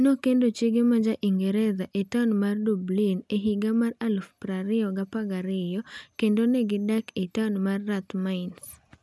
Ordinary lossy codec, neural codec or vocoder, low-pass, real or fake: none; none; none; real